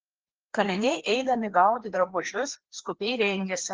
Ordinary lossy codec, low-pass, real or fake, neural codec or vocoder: Opus, 16 kbps; 7.2 kHz; fake; codec, 16 kHz, 2 kbps, FreqCodec, larger model